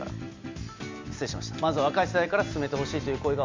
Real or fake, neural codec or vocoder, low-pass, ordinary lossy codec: real; none; 7.2 kHz; MP3, 64 kbps